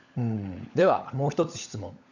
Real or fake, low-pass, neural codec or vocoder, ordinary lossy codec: fake; 7.2 kHz; codec, 16 kHz, 16 kbps, FunCodec, trained on LibriTTS, 50 frames a second; none